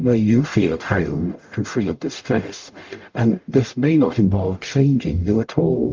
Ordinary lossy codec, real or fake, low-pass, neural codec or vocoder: Opus, 32 kbps; fake; 7.2 kHz; codec, 44.1 kHz, 0.9 kbps, DAC